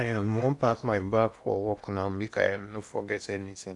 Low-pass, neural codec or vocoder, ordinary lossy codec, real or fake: 10.8 kHz; codec, 16 kHz in and 24 kHz out, 0.8 kbps, FocalCodec, streaming, 65536 codes; none; fake